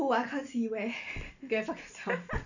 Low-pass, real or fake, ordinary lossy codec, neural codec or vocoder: 7.2 kHz; real; none; none